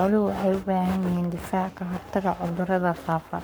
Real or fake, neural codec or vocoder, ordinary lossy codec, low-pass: fake; codec, 44.1 kHz, 7.8 kbps, Pupu-Codec; none; none